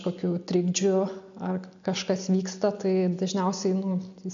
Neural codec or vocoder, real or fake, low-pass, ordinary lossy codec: none; real; 7.2 kHz; AAC, 64 kbps